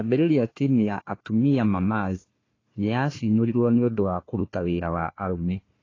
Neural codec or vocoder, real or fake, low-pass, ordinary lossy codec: codec, 16 kHz, 1 kbps, FunCodec, trained on Chinese and English, 50 frames a second; fake; 7.2 kHz; AAC, 32 kbps